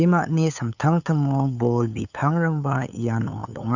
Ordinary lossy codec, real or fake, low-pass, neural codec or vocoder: none; fake; 7.2 kHz; codec, 16 kHz, 8 kbps, FunCodec, trained on LibriTTS, 25 frames a second